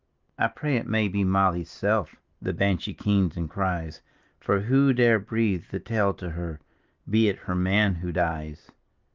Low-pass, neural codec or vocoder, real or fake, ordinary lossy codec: 7.2 kHz; none; real; Opus, 32 kbps